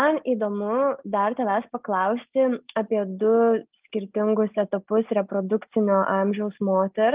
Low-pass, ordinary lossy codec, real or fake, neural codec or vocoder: 3.6 kHz; Opus, 24 kbps; real; none